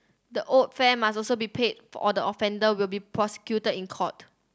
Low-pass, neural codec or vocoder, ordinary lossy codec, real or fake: none; none; none; real